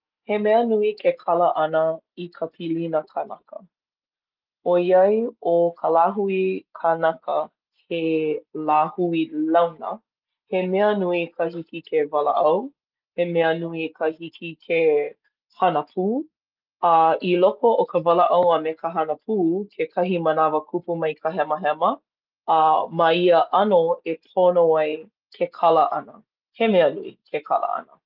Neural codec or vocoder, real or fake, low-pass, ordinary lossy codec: none; real; 5.4 kHz; Opus, 32 kbps